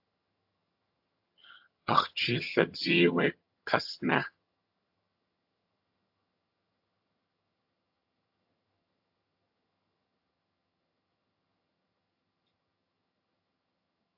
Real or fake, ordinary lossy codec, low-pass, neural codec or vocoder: fake; AAC, 48 kbps; 5.4 kHz; vocoder, 22.05 kHz, 80 mel bands, HiFi-GAN